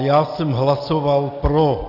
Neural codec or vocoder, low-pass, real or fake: none; 5.4 kHz; real